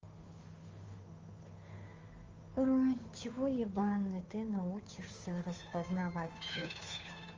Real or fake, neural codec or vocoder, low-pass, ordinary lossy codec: fake; codec, 16 kHz, 2 kbps, FunCodec, trained on Chinese and English, 25 frames a second; 7.2 kHz; Opus, 32 kbps